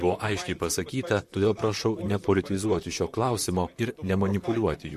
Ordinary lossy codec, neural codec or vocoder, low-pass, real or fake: MP3, 64 kbps; vocoder, 44.1 kHz, 128 mel bands, Pupu-Vocoder; 14.4 kHz; fake